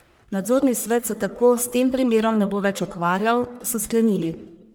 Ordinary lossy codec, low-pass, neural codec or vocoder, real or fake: none; none; codec, 44.1 kHz, 1.7 kbps, Pupu-Codec; fake